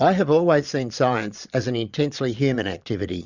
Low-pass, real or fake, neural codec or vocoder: 7.2 kHz; fake; vocoder, 22.05 kHz, 80 mel bands, Vocos